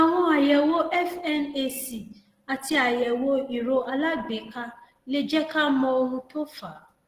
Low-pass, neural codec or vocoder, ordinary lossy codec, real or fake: 14.4 kHz; none; Opus, 16 kbps; real